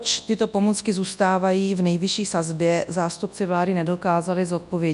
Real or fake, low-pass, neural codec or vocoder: fake; 10.8 kHz; codec, 24 kHz, 0.9 kbps, WavTokenizer, large speech release